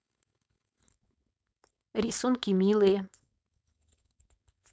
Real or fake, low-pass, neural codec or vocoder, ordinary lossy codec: fake; none; codec, 16 kHz, 4.8 kbps, FACodec; none